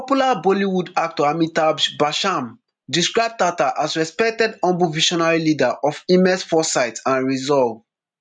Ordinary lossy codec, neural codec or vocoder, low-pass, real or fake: none; none; 9.9 kHz; real